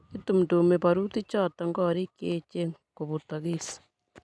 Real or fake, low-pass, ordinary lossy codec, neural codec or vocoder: real; none; none; none